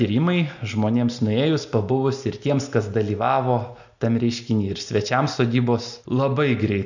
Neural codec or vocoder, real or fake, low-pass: none; real; 7.2 kHz